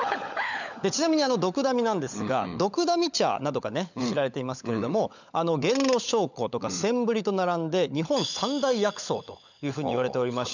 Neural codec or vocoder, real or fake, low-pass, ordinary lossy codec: codec, 16 kHz, 16 kbps, FunCodec, trained on LibriTTS, 50 frames a second; fake; 7.2 kHz; none